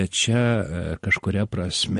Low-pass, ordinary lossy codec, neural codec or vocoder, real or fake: 14.4 kHz; MP3, 48 kbps; none; real